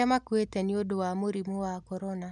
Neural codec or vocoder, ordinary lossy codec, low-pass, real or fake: none; none; 10.8 kHz; real